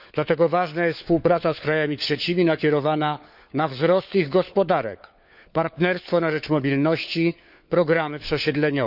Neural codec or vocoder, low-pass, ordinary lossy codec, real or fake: codec, 16 kHz, 4 kbps, FunCodec, trained on LibriTTS, 50 frames a second; 5.4 kHz; none; fake